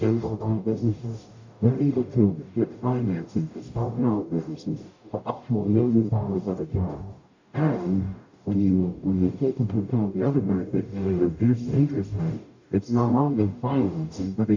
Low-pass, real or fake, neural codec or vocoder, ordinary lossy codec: 7.2 kHz; fake; codec, 44.1 kHz, 0.9 kbps, DAC; AAC, 48 kbps